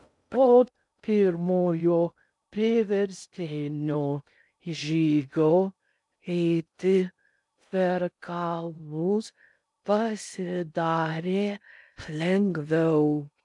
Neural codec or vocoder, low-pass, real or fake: codec, 16 kHz in and 24 kHz out, 0.6 kbps, FocalCodec, streaming, 2048 codes; 10.8 kHz; fake